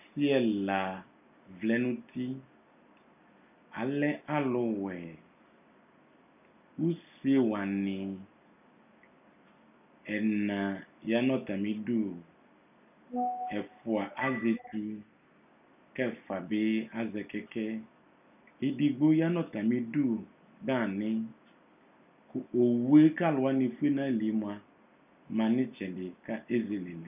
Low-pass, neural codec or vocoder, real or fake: 3.6 kHz; none; real